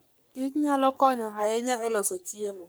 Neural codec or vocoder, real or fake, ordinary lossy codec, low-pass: codec, 44.1 kHz, 3.4 kbps, Pupu-Codec; fake; none; none